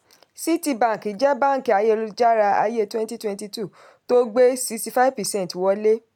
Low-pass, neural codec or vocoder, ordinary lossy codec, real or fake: 19.8 kHz; none; none; real